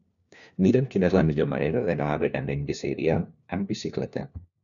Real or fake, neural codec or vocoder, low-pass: fake; codec, 16 kHz, 1 kbps, FunCodec, trained on LibriTTS, 50 frames a second; 7.2 kHz